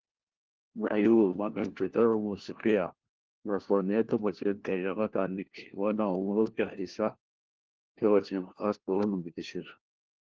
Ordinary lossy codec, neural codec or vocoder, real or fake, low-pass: Opus, 16 kbps; codec, 16 kHz, 1 kbps, FunCodec, trained on LibriTTS, 50 frames a second; fake; 7.2 kHz